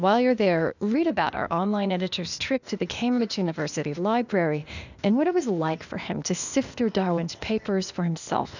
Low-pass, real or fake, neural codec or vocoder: 7.2 kHz; fake; codec, 16 kHz, 0.8 kbps, ZipCodec